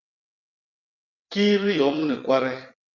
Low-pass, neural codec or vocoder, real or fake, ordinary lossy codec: 7.2 kHz; vocoder, 22.05 kHz, 80 mel bands, WaveNeXt; fake; Opus, 64 kbps